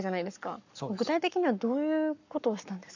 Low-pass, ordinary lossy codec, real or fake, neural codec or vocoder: 7.2 kHz; none; fake; codec, 44.1 kHz, 7.8 kbps, Pupu-Codec